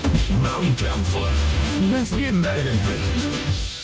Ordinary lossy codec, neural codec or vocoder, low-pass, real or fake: none; codec, 16 kHz, 0.5 kbps, FunCodec, trained on Chinese and English, 25 frames a second; none; fake